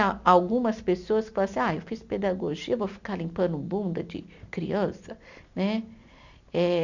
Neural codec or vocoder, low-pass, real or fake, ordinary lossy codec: none; 7.2 kHz; real; none